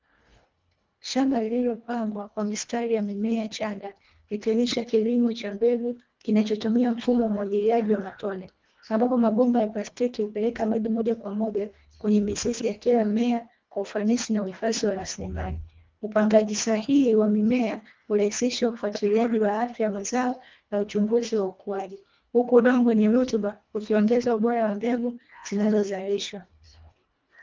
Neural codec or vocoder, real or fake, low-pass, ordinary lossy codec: codec, 24 kHz, 1.5 kbps, HILCodec; fake; 7.2 kHz; Opus, 32 kbps